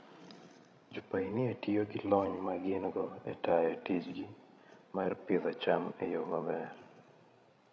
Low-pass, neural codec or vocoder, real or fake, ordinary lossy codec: none; codec, 16 kHz, 16 kbps, FreqCodec, larger model; fake; none